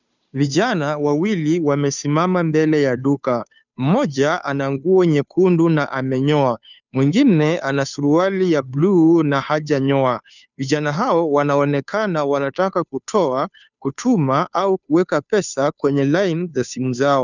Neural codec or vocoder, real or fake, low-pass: codec, 16 kHz, 2 kbps, FunCodec, trained on Chinese and English, 25 frames a second; fake; 7.2 kHz